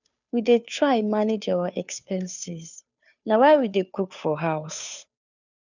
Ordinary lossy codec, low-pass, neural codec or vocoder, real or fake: none; 7.2 kHz; codec, 16 kHz, 2 kbps, FunCodec, trained on Chinese and English, 25 frames a second; fake